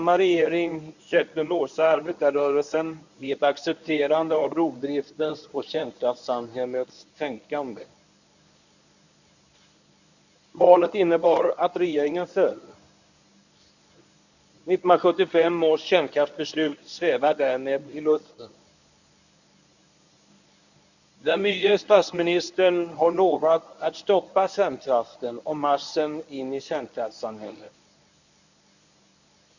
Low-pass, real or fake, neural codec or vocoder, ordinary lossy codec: 7.2 kHz; fake; codec, 24 kHz, 0.9 kbps, WavTokenizer, medium speech release version 1; none